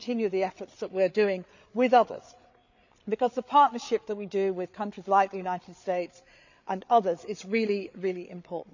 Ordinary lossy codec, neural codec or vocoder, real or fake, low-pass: none; codec, 16 kHz, 8 kbps, FreqCodec, larger model; fake; 7.2 kHz